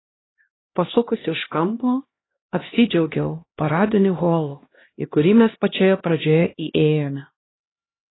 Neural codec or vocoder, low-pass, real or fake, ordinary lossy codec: codec, 16 kHz, 1 kbps, X-Codec, HuBERT features, trained on LibriSpeech; 7.2 kHz; fake; AAC, 16 kbps